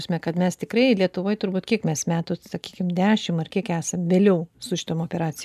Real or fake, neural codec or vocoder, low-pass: real; none; 14.4 kHz